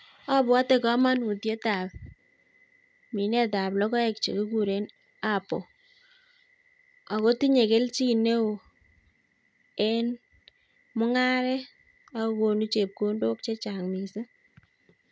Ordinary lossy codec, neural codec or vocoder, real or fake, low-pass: none; none; real; none